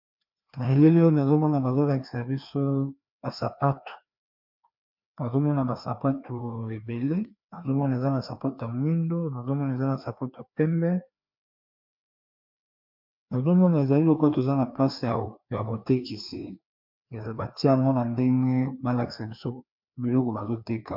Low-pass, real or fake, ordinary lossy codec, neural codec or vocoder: 5.4 kHz; fake; MP3, 48 kbps; codec, 16 kHz, 2 kbps, FreqCodec, larger model